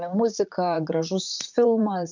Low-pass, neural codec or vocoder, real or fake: 7.2 kHz; vocoder, 44.1 kHz, 128 mel bands, Pupu-Vocoder; fake